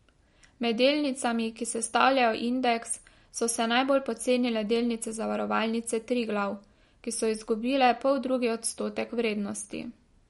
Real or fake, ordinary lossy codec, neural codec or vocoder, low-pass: real; MP3, 48 kbps; none; 19.8 kHz